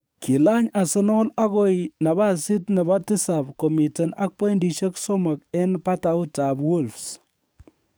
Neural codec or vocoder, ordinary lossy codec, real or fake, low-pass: codec, 44.1 kHz, 7.8 kbps, DAC; none; fake; none